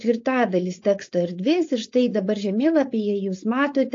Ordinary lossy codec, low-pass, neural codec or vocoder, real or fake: AAC, 48 kbps; 7.2 kHz; codec, 16 kHz, 4.8 kbps, FACodec; fake